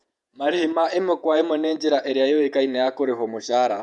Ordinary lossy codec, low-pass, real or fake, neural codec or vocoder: none; 10.8 kHz; fake; vocoder, 48 kHz, 128 mel bands, Vocos